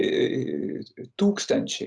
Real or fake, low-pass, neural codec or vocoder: real; 9.9 kHz; none